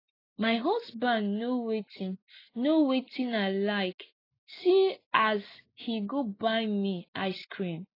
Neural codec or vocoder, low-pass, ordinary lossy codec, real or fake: none; 5.4 kHz; AAC, 24 kbps; real